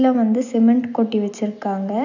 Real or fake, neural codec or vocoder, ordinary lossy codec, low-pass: real; none; none; 7.2 kHz